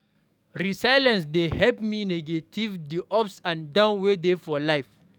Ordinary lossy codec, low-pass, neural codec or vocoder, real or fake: none; 19.8 kHz; codec, 44.1 kHz, 7.8 kbps, DAC; fake